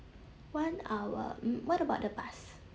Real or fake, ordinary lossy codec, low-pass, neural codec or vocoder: real; none; none; none